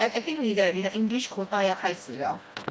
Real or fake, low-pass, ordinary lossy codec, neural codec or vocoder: fake; none; none; codec, 16 kHz, 1 kbps, FreqCodec, smaller model